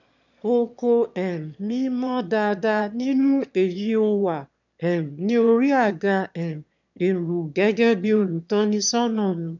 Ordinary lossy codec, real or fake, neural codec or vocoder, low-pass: none; fake; autoencoder, 22.05 kHz, a latent of 192 numbers a frame, VITS, trained on one speaker; 7.2 kHz